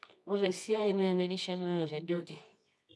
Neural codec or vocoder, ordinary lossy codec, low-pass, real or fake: codec, 24 kHz, 0.9 kbps, WavTokenizer, medium music audio release; none; none; fake